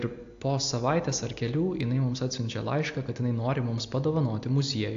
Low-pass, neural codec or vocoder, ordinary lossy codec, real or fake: 7.2 kHz; none; AAC, 48 kbps; real